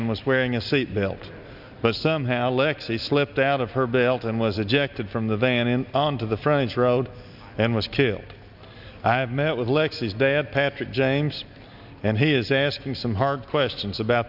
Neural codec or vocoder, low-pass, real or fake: none; 5.4 kHz; real